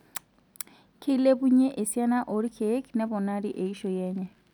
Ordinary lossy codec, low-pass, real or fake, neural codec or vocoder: none; 19.8 kHz; real; none